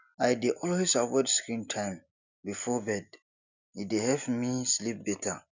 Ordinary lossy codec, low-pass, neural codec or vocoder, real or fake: none; 7.2 kHz; none; real